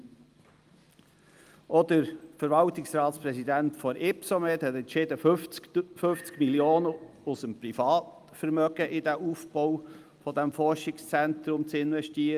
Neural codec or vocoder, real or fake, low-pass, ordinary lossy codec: none; real; 14.4 kHz; Opus, 32 kbps